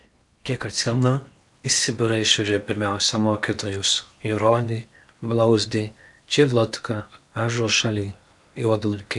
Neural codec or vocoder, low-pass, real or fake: codec, 16 kHz in and 24 kHz out, 0.8 kbps, FocalCodec, streaming, 65536 codes; 10.8 kHz; fake